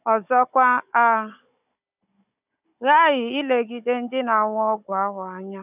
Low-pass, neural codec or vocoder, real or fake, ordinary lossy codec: 3.6 kHz; codec, 16 kHz, 16 kbps, FunCodec, trained on Chinese and English, 50 frames a second; fake; none